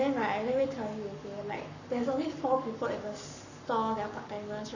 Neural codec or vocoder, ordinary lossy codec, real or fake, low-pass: codec, 44.1 kHz, 7.8 kbps, Pupu-Codec; none; fake; 7.2 kHz